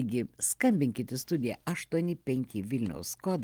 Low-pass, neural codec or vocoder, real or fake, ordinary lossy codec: 19.8 kHz; none; real; Opus, 24 kbps